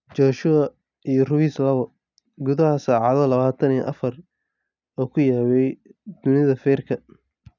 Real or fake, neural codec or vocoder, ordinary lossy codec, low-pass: real; none; none; 7.2 kHz